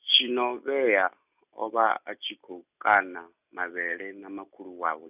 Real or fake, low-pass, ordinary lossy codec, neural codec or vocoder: real; 3.6 kHz; none; none